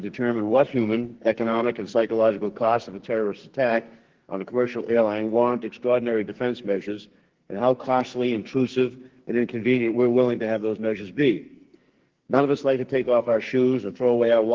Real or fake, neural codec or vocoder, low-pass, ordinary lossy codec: fake; codec, 44.1 kHz, 2.6 kbps, SNAC; 7.2 kHz; Opus, 16 kbps